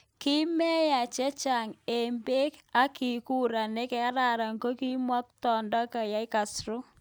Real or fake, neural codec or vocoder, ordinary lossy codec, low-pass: real; none; none; none